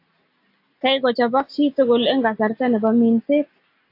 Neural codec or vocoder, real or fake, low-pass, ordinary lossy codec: none; real; 5.4 kHz; AAC, 32 kbps